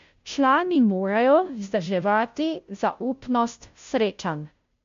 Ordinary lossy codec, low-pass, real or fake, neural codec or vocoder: MP3, 48 kbps; 7.2 kHz; fake; codec, 16 kHz, 0.5 kbps, FunCodec, trained on Chinese and English, 25 frames a second